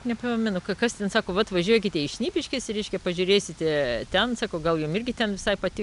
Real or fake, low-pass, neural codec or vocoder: real; 10.8 kHz; none